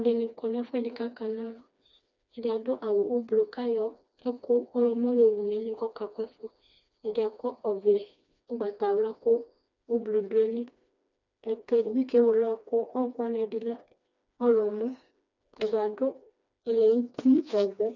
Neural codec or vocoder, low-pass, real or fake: codec, 16 kHz, 2 kbps, FreqCodec, smaller model; 7.2 kHz; fake